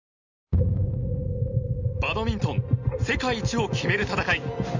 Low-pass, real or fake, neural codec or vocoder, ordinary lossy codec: 7.2 kHz; real; none; Opus, 64 kbps